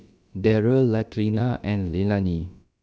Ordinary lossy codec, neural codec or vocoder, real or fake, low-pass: none; codec, 16 kHz, about 1 kbps, DyCAST, with the encoder's durations; fake; none